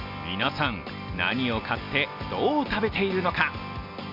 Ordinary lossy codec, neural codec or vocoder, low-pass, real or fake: none; none; 5.4 kHz; real